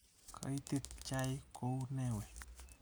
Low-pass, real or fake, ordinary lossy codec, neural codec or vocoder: none; real; none; none